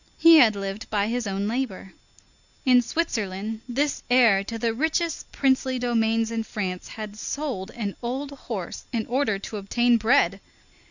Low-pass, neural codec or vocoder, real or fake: 7.2 kHz; none; real